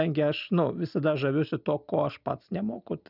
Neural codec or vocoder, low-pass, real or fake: none; 5.4 kHz; real